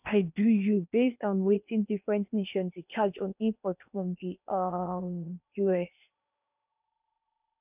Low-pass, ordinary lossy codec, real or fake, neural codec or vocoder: 3.6 kHz; none; fake; codec, 16 kHz in and 24 kHz out, 0.8 kbps, FocalCodec, streaming, 65536 codes